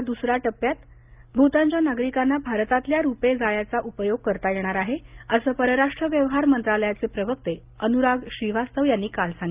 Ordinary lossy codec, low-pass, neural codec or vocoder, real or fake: Opus, 24 kbps; 3.6 kHz; none; real